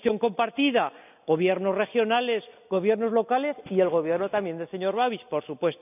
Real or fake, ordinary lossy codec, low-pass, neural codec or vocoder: real; none; 3.6 kHz; none